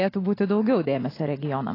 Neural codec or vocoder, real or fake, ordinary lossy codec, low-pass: none; real; AAC, 24 kbps; 5.4 kHz